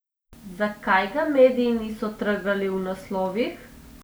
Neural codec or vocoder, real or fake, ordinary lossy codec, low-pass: none; real; none; none